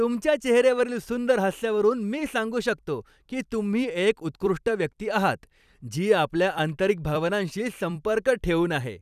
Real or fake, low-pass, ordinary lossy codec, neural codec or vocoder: fake; 14.4 kHz; none; vocoder, 44.1 kHz, 128 mel bands every 256 samples, BigVGAN v2